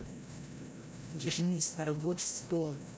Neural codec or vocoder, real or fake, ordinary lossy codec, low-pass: codec, 16 kHz, 0.5 kbps, FreqCodec, larger model; fake; none; none